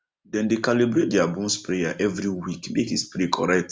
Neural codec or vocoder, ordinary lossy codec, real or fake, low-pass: none; none; real; none